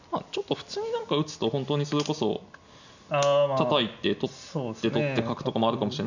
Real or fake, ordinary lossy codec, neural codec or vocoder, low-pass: real; none; none; 7.2 kHz